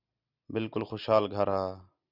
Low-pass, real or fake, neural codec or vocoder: 5.4 kHz; real; none